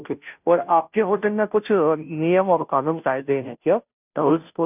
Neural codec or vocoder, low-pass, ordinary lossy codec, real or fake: codec, 16 kHz, 0.5 kbps, FunCodec, trained on Chinese and English, 25 frames a second; 3.6 kHz; none; fake